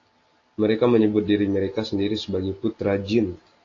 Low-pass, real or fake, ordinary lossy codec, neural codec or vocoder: 7.2 kHz; real; AAC, 32 kbps; none